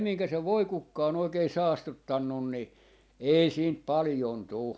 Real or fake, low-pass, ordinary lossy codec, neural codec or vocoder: real; none; none; none